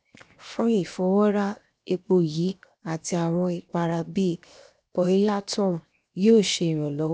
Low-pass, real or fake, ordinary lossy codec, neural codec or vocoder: none; fake; none; codec, 16 kHz, 0.7 kbps, FocalCodec